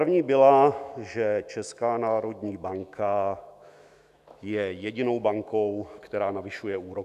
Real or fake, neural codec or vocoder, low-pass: fake; autoencoder, 48 kHz, 128 numbers a frame, DAC-VAE, trained on Japanese speech; 14.4 kHz